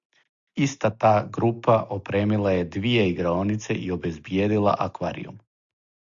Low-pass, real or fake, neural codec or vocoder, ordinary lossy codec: 7.2 kHz; real; none; Opus, 64 kbps